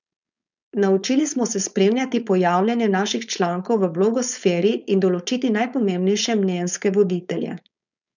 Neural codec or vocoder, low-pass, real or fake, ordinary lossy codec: codec, 16 kHz, 4.8 kbps, FACodec; 7.2 kHz; fake; none